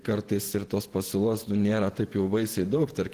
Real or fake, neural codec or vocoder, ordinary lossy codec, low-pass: fake; vocoder, 48 kHz, 128 mel bands, Vocos; Opus, 16 kbps; 14.4 kHz